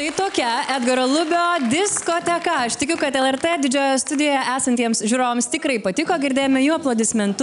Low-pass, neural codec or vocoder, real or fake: 10.8 kHz; none; real